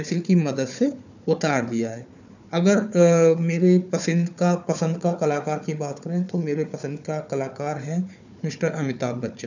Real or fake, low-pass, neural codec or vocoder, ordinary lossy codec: fake; 7.2 kHz; codec, 16 kHz, 4 kbps, FunCodec, trained on Chinese and English, 50 frames a second; none